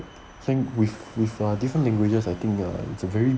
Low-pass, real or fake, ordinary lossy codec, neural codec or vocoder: none; real; none; none